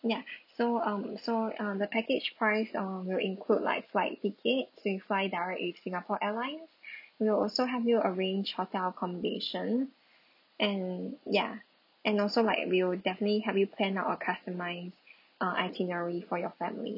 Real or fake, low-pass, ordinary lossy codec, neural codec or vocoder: real; 5.4 kHz; none; none